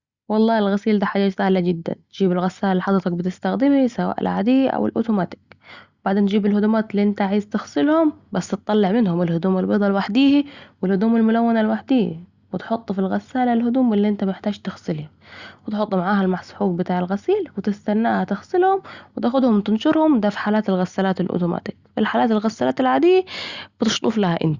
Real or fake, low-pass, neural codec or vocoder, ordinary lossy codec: real; 7.2 kHz; none; Opus, 64 kbps